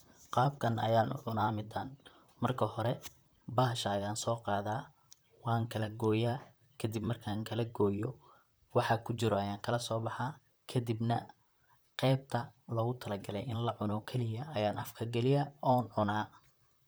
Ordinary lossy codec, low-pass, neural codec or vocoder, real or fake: none; none; vocoder, 44.1 kHz, 128 mel bands, Pupu-Vocoder; fake